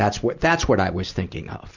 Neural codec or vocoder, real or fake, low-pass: none; real; 7.2 kHz